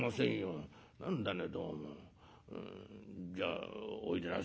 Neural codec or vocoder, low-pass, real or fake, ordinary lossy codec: none; none; real; none